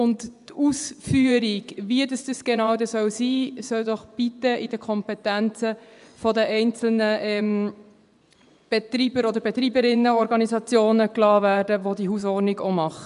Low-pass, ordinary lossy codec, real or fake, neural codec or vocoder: 10.8 kHz; none; fake; vocoder, 24 kHz, 100 mel bands, Vocos